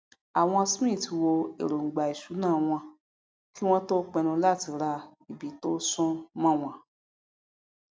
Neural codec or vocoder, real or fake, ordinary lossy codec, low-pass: none; real; none; none